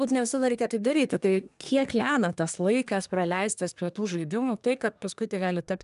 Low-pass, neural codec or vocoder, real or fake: 10.8 kHz; codec, 24 kHz, 1 kbps, SNAC; fake